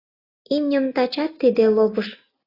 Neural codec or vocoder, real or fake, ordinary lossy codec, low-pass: none; real; AAC, 24 kbps; 5.4 kHz